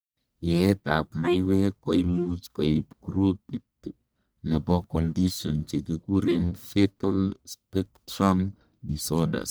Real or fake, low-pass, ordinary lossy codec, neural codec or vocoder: fake; none; none; codec, 44.1 kHz, 1.7 kbps, Pupu-Codec